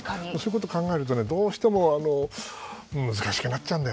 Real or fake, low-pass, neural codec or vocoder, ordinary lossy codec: real; none; none; none